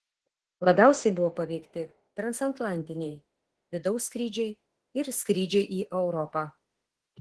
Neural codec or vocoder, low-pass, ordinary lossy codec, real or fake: autoencoder, 48 kHz, 32 numbers a frame, DAC-VAE, trained on Japanese speech; 10.8 kHz; Opus, 16 kbps; fake